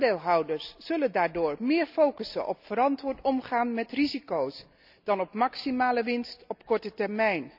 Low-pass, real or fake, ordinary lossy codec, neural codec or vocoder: 5.4 kHz; real; none; none